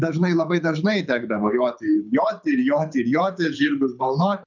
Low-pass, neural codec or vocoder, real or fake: 7.2 kHz; codec, 24 kHz, 6 kbps, HILCodec; fake